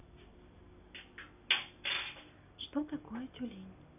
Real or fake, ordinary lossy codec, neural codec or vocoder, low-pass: real; none; none; 3.6 kHz